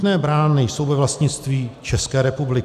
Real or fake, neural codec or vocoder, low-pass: real; none; 14.4 kHz